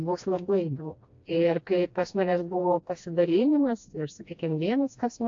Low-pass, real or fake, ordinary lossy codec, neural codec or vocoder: 7.2 kHz; fake; AAC, 48 kbps; codec, 16 kHz, 1 kbps, FreqCodec, smaller model